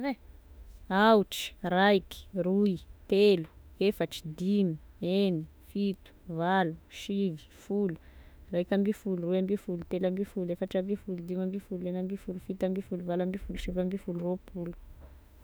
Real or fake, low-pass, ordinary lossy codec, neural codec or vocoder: fake; none; none; autoencoder, 48 kHz, 32 numbers a frame, DAC-VAE, trained on Japanese speech